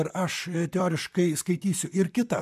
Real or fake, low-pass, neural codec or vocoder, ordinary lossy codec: fake; 14.4 kHz; vocoder, 44.1 kHz, 128 mel bands, Pupu-Vocoder; MP3, 96 kbps